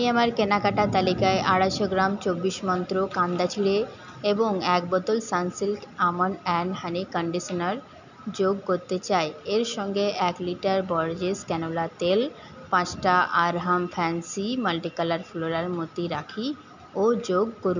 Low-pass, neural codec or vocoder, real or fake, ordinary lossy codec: 7.2 kHz; none; real; none